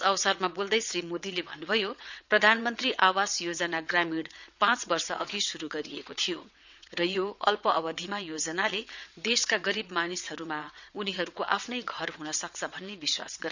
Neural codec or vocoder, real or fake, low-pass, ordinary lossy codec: vocoder, 22.05 kHz, 80 mel bands, WaveNeXt; fake; 7.2 kHz; none